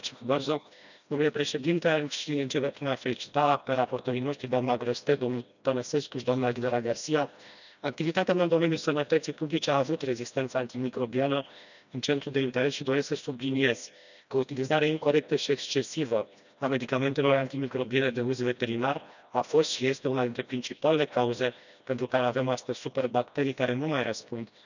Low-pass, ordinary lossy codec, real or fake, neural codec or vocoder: 7.2 kHz; none; fake; codec, 16 kHz, 1 kbps, FreqCodec, smaller model